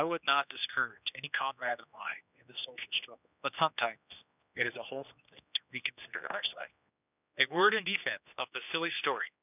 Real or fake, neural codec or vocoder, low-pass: fake; codec, 16 kHz, 2 kbps, X-Codec, HuBERT features, trained on general audio; 3.6 kHz